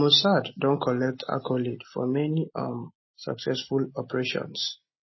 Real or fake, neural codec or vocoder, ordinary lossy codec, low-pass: real; none; MP3, 24 kbps; 7.2 kHz